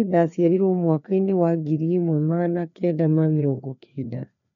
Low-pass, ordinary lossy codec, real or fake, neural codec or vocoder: 7.2 kHz; none; fake; codec, 16 kHz, 2 kbps, FreqCodec, larger model